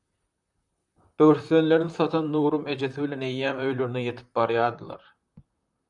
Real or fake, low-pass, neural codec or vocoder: fake; 10.8 kHz; vocoder, 44.1 kHz, 128 mel bands, Pupu-Vocoder